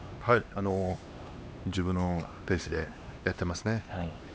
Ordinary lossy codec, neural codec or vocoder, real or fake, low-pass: none; codec, 16 kHz, 2 kbps, X-Codec, HuBERT features, trained on LibriSpeech; fake; none